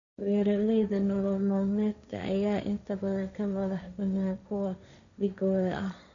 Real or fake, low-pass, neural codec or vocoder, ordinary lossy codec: fake; 7.2 kHz; codec, 16 kHz, 1.1 kbps, Voila-Tokenizer; none